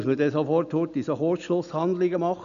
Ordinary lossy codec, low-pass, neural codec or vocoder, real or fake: none; 7.2 kHz; none; real